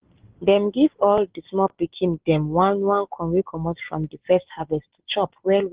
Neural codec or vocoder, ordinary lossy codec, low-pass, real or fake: none; Opus, 16 kbps; 3.6 kHz; real